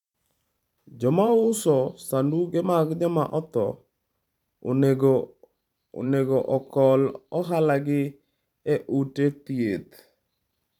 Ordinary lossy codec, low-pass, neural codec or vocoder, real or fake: none; 19.8 kHz; vocoder, 44.1 kHz, 128 mel bands every 512 samples, BigVGAN v2; fake